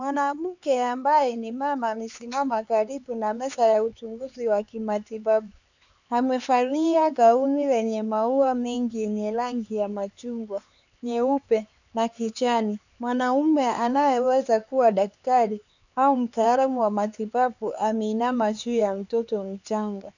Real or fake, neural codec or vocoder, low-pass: fake; codec, 16 kHz, 4 kbps, X-Codec, HuBERT features, trained on LibriSpeech; 7.2 kHz